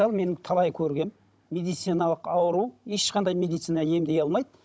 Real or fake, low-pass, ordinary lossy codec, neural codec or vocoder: fake; none; none; codec, 16 kHz, 16 kbps, FunCodec, trained on Chinese and English, 50 frames a second